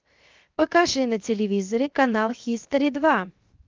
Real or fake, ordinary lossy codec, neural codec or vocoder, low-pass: fake; Opus, 24 kbps; codec, 16 kHz, 0.7 kbps, FocalCodec; 7.2 kHz